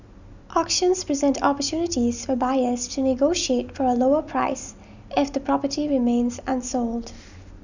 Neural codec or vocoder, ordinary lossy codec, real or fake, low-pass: none; none; real; 7.2 kHz